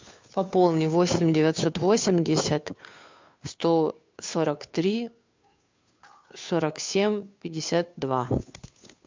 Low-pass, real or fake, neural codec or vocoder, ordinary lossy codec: 7.2 kHz; fake; codec, 16 kHz, 2 kbps, FunCodec, trained on Chinese and English, 25 frames a second; MP3, 64 kbps